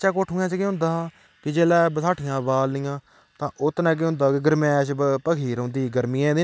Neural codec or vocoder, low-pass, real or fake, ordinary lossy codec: none; none; real; none